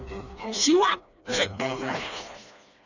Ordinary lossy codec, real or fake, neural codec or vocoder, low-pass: none; fake; codec, 16 kHz, 2 kbps, FreqCodec, smaller model; 7.2 kHz